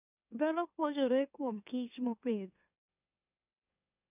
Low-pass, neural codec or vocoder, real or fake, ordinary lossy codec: 3.6 kHz; autoencoder, 44.1 kHz, a latent of 192 numbers a frame, MeloTTS; fake; none